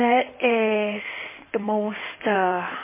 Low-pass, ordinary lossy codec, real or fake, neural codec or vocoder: 3.6 kHz; MP3, 16 kbps; fake; codec, 16 kHz in and 24 kHz out, 2.2 kbps, FireRedTTS-2 codec